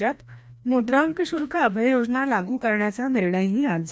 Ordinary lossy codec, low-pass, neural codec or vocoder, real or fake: none; none; codec, 16 kHz, 1 kbps, FreqCodec, larger model; fake